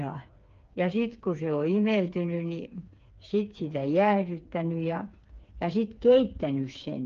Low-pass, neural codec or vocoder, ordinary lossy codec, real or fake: 7.2 kHz; codec, 16 kHz, 4 kbps, FreqCodec, smaller model; Opus, 24 kbps; fake